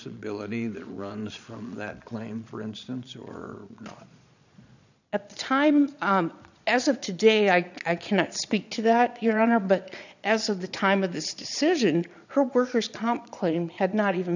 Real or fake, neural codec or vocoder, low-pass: fake; vocoder, 22.05 kHz, 80 mel bands, Vocos; 7.2 kHz